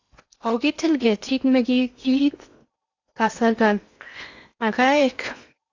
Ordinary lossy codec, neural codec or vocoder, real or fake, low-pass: AAC, 48 kbps; codec, 16 kHz in and 24 kHz out, 0.8 kbps, FocalCodec, streaming, 65536 codes; fake; 7.2 kHz